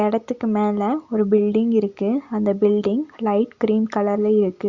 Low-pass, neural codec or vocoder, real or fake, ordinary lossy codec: 7.2 kHz; none; real; Opus, 64 kbps